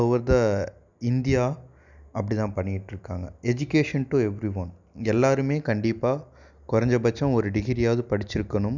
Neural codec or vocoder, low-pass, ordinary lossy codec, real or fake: none; 7.2 kHz; none; real